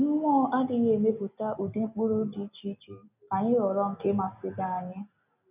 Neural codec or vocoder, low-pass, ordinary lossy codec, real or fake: none; 3.6 kHz; none; real